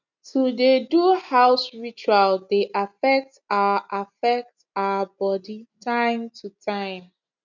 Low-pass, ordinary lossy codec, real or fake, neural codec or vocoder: 7.2 kHz; none; real; none